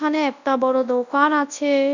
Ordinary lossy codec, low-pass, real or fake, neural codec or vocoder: none; 7.2 kHz; fake; codec, 24 kHz, 0.9 kbps, WavTokenizer, large speech release